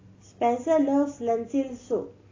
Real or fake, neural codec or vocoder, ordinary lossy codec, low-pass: real; none; AAC, 32 kbps; 7.2 kHz